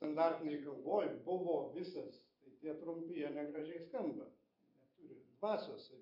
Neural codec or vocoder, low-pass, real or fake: vocoder, 24 kHz, 100 mel bands, Vocos; 5.4 kHz; fake